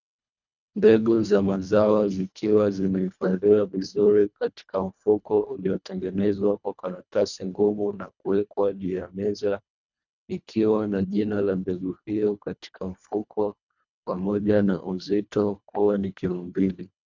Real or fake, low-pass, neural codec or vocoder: fake; 7.2 kHz; codec, 24 kHz, 1.5 kbps, HILCodec